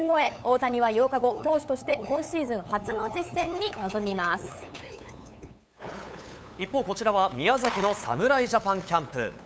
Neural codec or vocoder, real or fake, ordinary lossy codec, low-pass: codec, 16 kHz, 8 kbps, FunCodec, trained on LibriTTS, 25 frames a second; fake; none; none